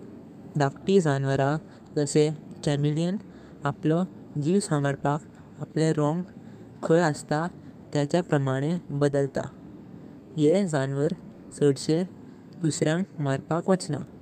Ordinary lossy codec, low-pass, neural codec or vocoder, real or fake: none; 14.4 kHz; codec, 32 kHz, 1.9 kbps, SNAC; fake